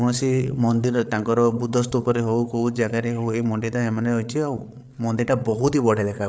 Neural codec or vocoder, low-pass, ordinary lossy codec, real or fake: codec, 16 kHz, 8 kbps, FreqCodec, larger model; none; none; fake